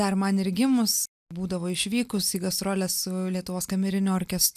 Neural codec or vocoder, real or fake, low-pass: none; real; 14.4 kHz